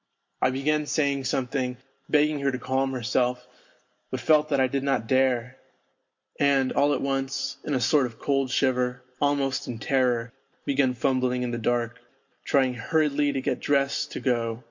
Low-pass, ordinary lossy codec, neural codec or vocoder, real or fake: 7.2 kHz; MP3, 48 kbps; none; real